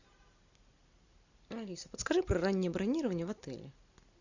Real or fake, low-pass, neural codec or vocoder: real; 7.2 kHz; none